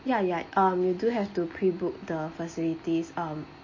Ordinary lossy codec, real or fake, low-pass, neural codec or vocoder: MP3, 32 kbps; real; 7.2 kHz; none